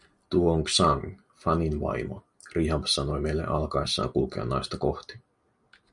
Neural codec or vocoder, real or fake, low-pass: none; real; 10.8 kHz